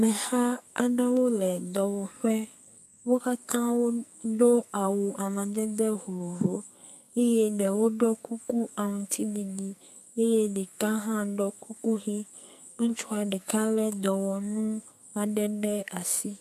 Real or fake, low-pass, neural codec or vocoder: fake; 14.4 kHz; codec, 44.1 kHz, 2.6 kbps, SNAC